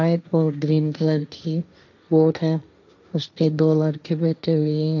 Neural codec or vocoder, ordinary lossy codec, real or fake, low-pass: codec, 16 kHz, 1.1 kbps, Voila-Tokenizer; none; fake; 7.2 kHz